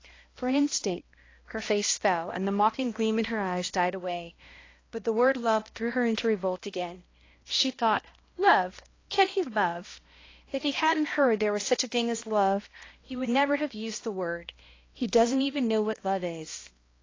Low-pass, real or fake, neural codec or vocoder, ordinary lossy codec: 7.2 kHz; fake; codec, 16 kHz, 1 kbps, X-Codec, HuBERT features, trained on balanced general audio; AAC, 32 kbps